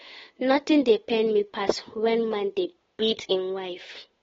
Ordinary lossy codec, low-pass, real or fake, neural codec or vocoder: AAC, 24 kbps; 7.2 kHz; fake; codec, 16 kHz, 8 kbps, FunCodec, trained on Chinese and English, 25 frames a second